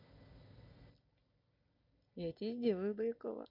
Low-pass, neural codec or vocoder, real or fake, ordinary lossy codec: 5.4 kHz; none; real; none